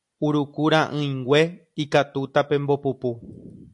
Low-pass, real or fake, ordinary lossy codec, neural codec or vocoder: 10.8 kHz; real; AAC, 64 kbps; none